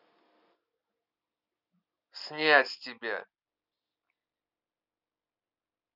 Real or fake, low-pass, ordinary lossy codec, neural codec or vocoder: real; 5.4 kHz; none; none